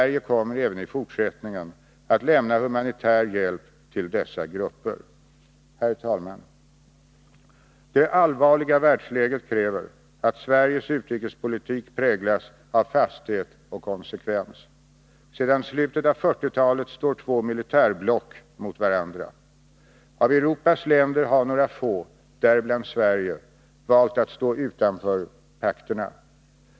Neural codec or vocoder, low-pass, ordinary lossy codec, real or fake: none; none; none; real